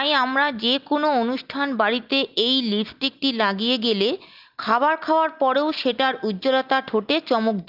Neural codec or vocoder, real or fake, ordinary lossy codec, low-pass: none; real; Opus, 24 kbps; 5.4 kHz